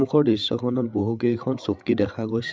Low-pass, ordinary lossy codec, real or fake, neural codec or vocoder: none; none; fake; codec, 16 kHz, 8 kbps, FreqCodec, larger model